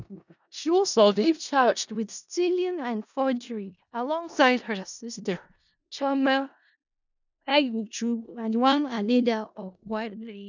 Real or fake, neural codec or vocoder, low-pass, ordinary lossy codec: fake; codec, 16 kHz in and 24 kHz out, 0.4 kbps, LongCat-Audio-Codec, four codebook decoder; 7.2 kHz; none